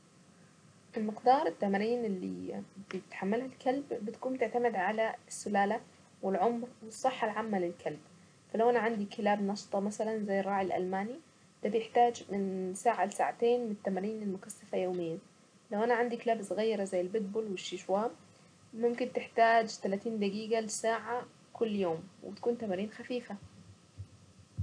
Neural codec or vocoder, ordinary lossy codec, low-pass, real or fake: none; none; 9.9 kHz; real